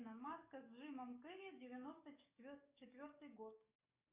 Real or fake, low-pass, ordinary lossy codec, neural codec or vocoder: fake; 3.6 kHz; Opus, 64 kbps; codec, 44.1 kHz, 7.8 kbps, DAC